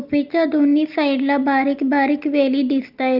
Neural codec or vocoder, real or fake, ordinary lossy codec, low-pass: none; real; Opus, 24 kbps; 5.4 kHz